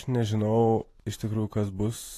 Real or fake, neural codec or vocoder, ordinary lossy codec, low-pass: fake; vocoder, 44.1 kHz, 128 mel bands every 512 samples, BigVGAN v2; AAC, 48 kbps; 14.4 kHz